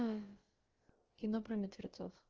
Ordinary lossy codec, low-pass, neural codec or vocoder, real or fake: Opus, 16 kbps; 7.2 kHz; codec, 16 kHz, about 1 kbps, DyCAST, with the encoder's durations; fake